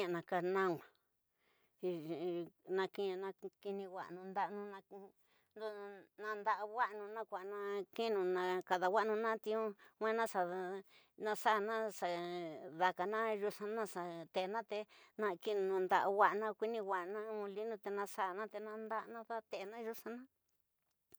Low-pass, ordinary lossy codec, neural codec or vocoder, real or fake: none; none; none; real